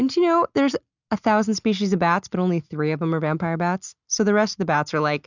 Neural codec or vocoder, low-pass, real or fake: none; 7.2 kHz; real